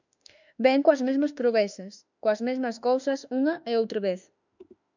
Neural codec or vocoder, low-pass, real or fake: autoencoder, 48 kHz, 32 numbers a frame, DAC-VAE, trained on Japanese speech; 7.2 kHz; fake